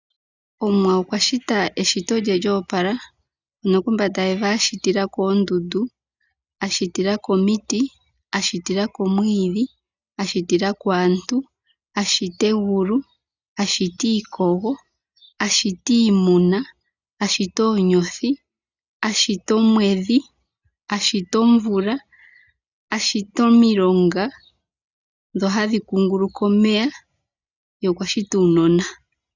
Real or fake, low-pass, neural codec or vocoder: real; 7.2 kHz; none